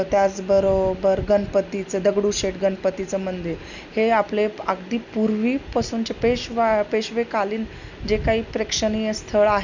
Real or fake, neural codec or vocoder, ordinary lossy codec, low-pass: real; none; none; 7.2 kHz